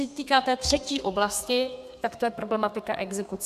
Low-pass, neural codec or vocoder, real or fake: 14.4 kHz; codec, 32 kHz, 1.9 kbps, SNAC; fake